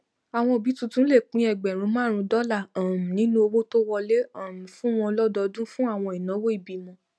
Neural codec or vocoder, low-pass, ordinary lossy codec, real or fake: none; none; none; real